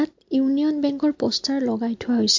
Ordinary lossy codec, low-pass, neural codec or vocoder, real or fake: MP3, 48 kbps; 7.2 kHz; none; real